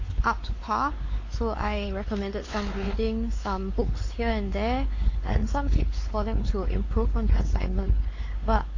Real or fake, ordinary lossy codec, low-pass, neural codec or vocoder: fake; AAC, 32 kbps; 7.2 kHz; codec, 16 kHz, 4 kbps, X-Codec, WavLM features, trained on Multilingual LibriSpeech